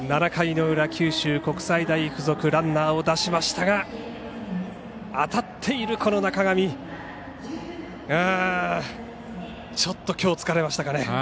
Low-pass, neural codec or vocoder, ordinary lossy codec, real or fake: none; none; none; real